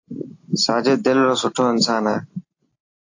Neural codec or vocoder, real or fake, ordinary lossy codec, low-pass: none; real; AAC, 48 kbps; 7.2 kHz